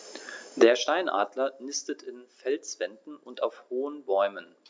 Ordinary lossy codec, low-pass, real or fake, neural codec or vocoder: none; none; real; none